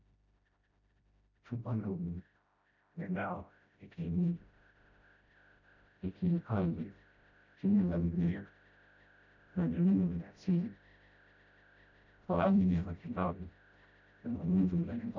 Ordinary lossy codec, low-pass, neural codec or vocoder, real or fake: MP3, 64 kbps; 7.2 kHz; codec, 16 kHz, 0.5 kbps, FreqCodec, smaller model; fake